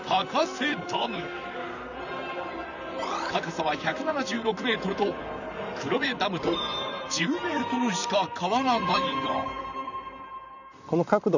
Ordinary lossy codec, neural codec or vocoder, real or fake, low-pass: none; vocoder, 44.1 kHz, 128 mel bands, Pupu-Vocoder; fake; 7.2 kHz